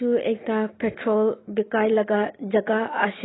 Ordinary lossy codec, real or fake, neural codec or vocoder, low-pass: AAC, 16 kbps; real; none; 7.2 kHz